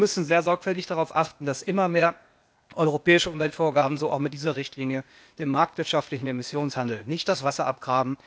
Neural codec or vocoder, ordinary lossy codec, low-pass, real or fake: codec, 16 kHz, 0.8 kbps, ZipCodec; none; none; fake